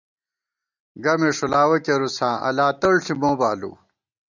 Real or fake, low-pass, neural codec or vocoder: real; 7.2 kHz; none